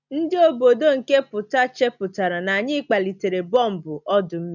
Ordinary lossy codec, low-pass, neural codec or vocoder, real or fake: none; 7.2 kHz; none; real